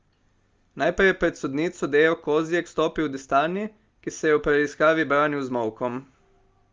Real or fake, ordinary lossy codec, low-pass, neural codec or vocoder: real; Opus, 32 kbps; 7.2 kHz; none